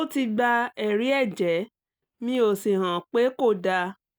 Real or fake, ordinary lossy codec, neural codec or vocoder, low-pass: real; none; none; none